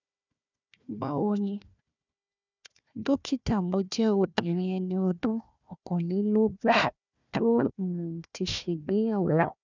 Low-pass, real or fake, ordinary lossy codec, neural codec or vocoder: 7.2 kHz; fake; none; codec, 16 kHz, 1 kbps, FunCodec, trained on Chinese and English, 50 frames a second